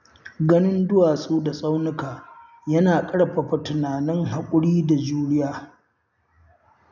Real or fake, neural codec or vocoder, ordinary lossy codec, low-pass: real; none; none; 7.2 kHz